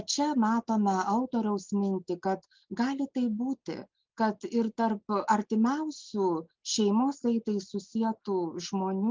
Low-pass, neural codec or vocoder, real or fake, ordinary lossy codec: 7.2 kHz; none; real; Opus, 32 kbps